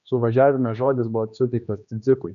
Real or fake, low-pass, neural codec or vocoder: fake; 7.2 kHz; codec, 16 kHz, 2 kbps, X-Codec, HuBERT features, trained on general audio